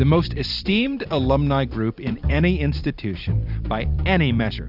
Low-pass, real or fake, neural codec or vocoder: 5.4 kHz; fake; vocoder, 44.1 kHz, 128 mel bands every 256 samples, BigVGAN v2